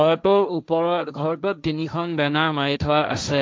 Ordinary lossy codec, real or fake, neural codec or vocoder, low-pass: none; fake; codec, 16 kHz, 1.1 kbps, Voila-Tokenizer; none